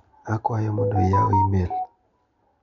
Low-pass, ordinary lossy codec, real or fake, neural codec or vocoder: 7.2 kHz; MP3, 96 kbps; real; none